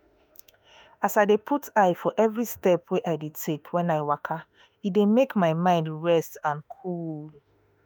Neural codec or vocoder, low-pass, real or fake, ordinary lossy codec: autoencoder, 48 kHz, 32 numbers a frame, DAC-VAE, trained on Japanese speech; none; fake; none